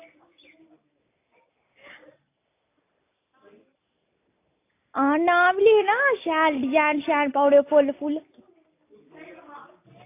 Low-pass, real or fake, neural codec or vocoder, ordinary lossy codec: 3.6 kHz; real; none; AAC, 24 kbps